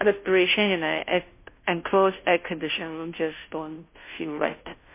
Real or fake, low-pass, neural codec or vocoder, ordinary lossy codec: fake; 3.6 kHz; codec, 16 kHz, 0.5 kbps, FunCodec, trained on Chinese and English, 25 frames a second; MP3, 24 kbps